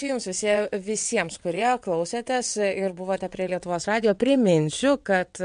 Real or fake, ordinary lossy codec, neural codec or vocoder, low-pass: fake; MP3, 64 kbps; vocoder, 22.05 kHz, 80 mel bands, WaveNeXt; 9.9 kHz